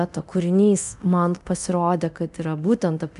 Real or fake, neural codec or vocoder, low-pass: fake; codec, 24 kHz, 0.9 kbps, DualCodec; 10.8 kHz